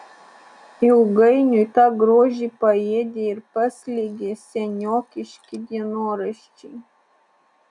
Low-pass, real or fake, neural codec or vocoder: 10.8 kHz; real; none